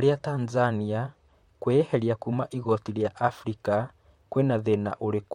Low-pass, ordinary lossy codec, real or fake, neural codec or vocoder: 19.8 kHz; MP3, 64 kbps; fake; vocoder, 44.1 kHz, 128 mel bands, Pupu-Vocoder